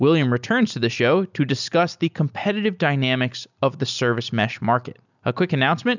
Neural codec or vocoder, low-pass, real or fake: none; 7.2 kHz; real